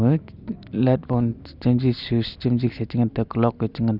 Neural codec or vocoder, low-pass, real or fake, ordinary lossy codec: none; 5.4 kHz; real; none